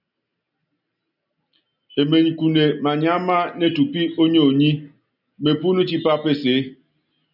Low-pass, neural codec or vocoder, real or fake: 5.4 kHz; none; real